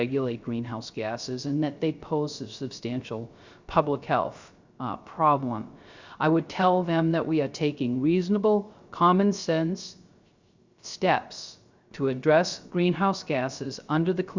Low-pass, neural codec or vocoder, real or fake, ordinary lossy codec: 7.2 kHz; codec, 16 kHz, 0.3 kbps, FocalCodec; fake; Opus, 64 kbps